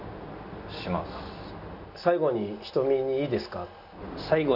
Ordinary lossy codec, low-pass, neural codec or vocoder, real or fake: none; 5.4 kHz; none; real